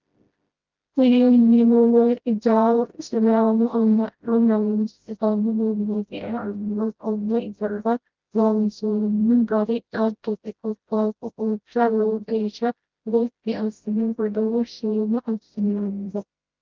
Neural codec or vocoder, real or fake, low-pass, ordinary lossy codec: codec, 16 kHz, 0.5 kbps, FreqCodec, smaller model; fake; 7.2 kHz; Opus, 24 kbps